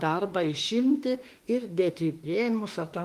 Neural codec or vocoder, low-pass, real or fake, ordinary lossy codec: autoencoder, 48 kHz, 32 numbers a frame, DAC-VAE, trained on Japanese speech; 14.4 kHz; fake; Opus, 24 kbps